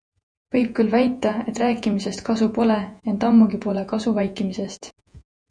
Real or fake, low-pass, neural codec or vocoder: fake; 9.9 kHz; vocoder, 48 kHz, 128 mel bands, Vocos